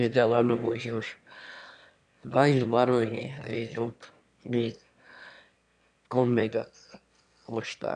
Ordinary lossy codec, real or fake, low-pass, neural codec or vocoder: AAC, 96 kbps; fake; 9.9 kHz; autoencoder, 22.05 kHz, a latent of 192 numbers a frame, VITS, trained on one speaker